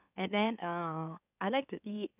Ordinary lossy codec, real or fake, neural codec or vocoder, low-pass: none; fake; autoencoder, 44.1 kHz, a latent of 192 numbers a frame, MeloTTS; 3.6 kHz